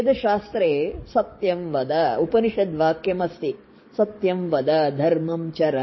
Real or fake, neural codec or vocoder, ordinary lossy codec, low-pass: fake; codec, 44.1 kHz, 7.8 kbps, DAC; MP3, 24 kbps; 7.2 kHz